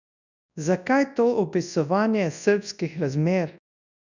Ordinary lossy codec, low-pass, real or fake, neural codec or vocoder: none; 7.2 kHz; fake; codec, 24 kHz, 0.9 kbps, WavTokenizer, large speech release